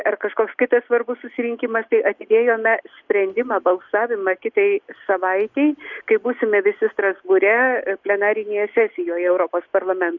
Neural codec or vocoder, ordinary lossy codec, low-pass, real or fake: none; Opus, 64 kbps; 7.2 kHz; real